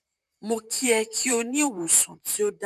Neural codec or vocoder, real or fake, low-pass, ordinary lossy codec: vocoder, 44.1 kHz, 128 mel bands, Pupu-Vocoder; fake; 14.4 kHz; none